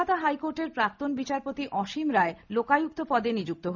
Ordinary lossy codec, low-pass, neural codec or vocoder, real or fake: none; 7.2 kHz; none; real